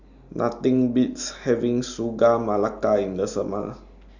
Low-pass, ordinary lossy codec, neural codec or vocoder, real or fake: 7.2 kHz; none; none; real